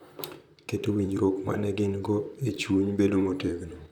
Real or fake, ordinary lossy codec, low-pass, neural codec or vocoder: fake; none; 19.8 kHz; vocoder, 44.1 kHz, 128 mel bands, Pupu-Vocoder